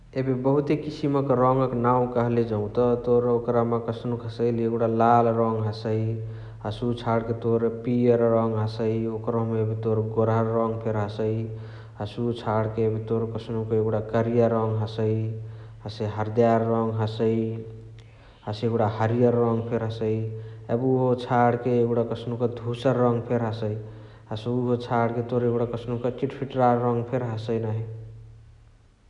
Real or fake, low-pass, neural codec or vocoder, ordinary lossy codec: real; 10.8 kHz; none; none